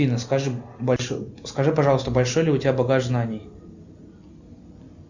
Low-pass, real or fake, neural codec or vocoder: 7.2 kHz; real; none